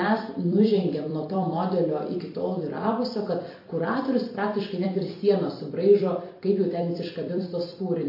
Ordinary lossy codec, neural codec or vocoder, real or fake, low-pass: MP3, 32 kbps; vocoder, 44.1 kHz, 128 mel bands every 256 samples, BigVGAN v2; fake; 5.4 kHz